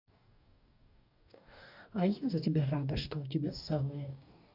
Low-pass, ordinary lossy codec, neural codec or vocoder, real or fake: 5.4 kHz; none; codec, 44.1 kHz, 2.6 kbps, DAC; fake